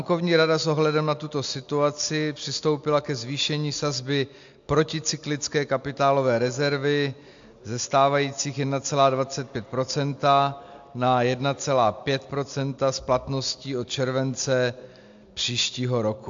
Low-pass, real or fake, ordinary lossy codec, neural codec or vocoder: 7.2 kHz; real; AAC, 64 kbps; none